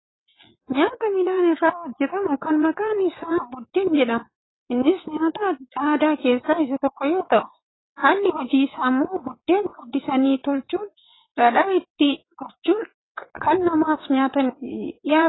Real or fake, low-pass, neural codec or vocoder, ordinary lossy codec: fake; 7.2 kHz; vocoder, 22.05 kHz, 80 mel bands, WaveNeXt; AAC, 16 kbps